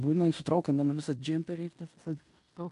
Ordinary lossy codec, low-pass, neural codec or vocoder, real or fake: AAC, 64 kbps; 10.8 kHz; codec, 16 kHz in and 24 kHz out, 0.9 kbps, LongCat-Audio-Codec, fine tuned four codebook decoder; fake